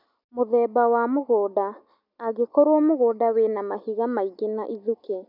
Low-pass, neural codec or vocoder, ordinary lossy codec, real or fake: 5.4 kHz; none; none; real